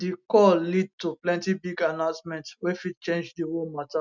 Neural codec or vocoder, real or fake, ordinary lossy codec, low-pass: none; real; none; 7.2 kHz